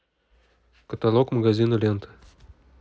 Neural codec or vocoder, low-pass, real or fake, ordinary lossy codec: none; none; real; none